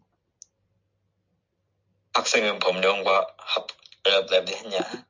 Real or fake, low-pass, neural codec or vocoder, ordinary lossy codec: real; 7.2 kHz; none; MP3, 64 kbps